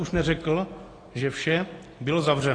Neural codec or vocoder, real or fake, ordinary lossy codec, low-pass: none; real; AAC, 32 kbps; 9.9 kHz